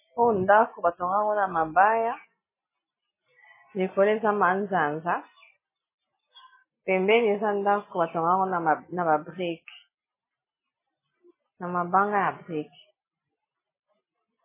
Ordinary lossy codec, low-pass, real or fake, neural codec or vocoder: MP3, 16 kbps; 3.6 kHz; real; none